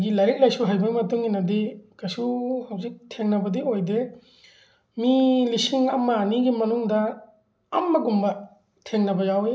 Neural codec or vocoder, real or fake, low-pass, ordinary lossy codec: none; real; none; none